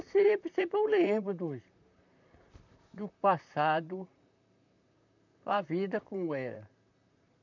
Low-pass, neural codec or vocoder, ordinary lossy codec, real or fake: 7.2 kHz; vocoder, 44.1 kHz, 128 mel bands, Pupu-Vocoder; none; fake